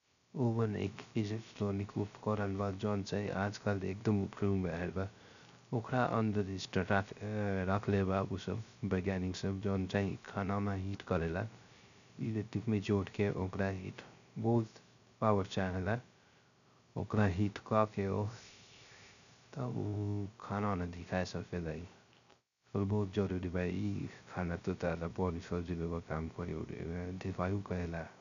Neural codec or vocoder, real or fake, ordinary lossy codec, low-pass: codec, 16 kHz, 0.3 kbps, FocalCodec; fake; none; 7.2 kHz